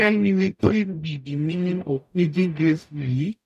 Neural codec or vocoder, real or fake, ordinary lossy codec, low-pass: codec, 44.1 kHz, 0.9 kbps, DAC; fake; none; 14.4 kHz